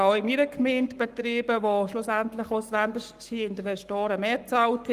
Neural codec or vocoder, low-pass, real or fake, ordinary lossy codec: codec, 44.1 kHz, 7.8 kbps, Pupu-Codec; 14.4 kHz; fake; Opus, 32 kbps